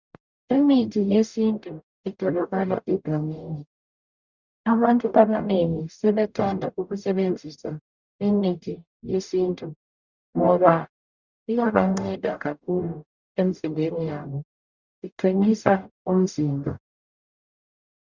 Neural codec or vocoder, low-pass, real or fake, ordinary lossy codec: codec, 44.1 kHz, 0.9 kbps, DAC; 7.2 kHz; fake; Opus, 64 kbps